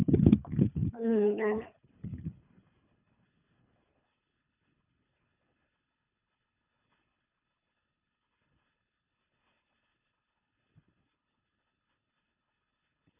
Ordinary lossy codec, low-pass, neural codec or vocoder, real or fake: none; 3.6 kHz; codec, 24 kHz, 3 kbps, HILCodec; fake